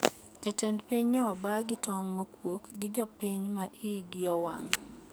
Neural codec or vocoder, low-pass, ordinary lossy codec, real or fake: codec, 44.1 kHz, 2.6 kbps, SNAC; none; none; fake